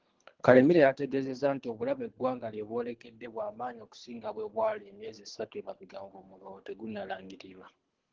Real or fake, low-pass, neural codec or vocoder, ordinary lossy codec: fake; 7.2 kHz; codec, 24 kHz, 3 kbps, HILCodec; Opus, 16 kbps